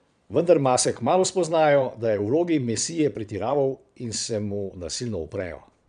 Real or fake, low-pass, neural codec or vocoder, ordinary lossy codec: fake; 9.9 kHz; vocoder, 22.05 kHz, 80 mel bands, Vocos; none